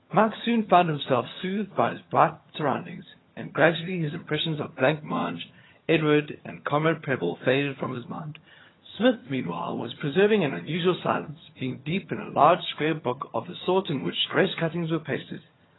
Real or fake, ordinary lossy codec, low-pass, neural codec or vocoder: fake; AAC, 16 kbps; 7.2 kHz; vocoder, 22.05 kHz, 80 mel bands, HiFi-GAN